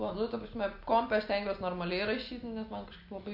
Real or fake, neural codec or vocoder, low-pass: real; none; 5.4 kHz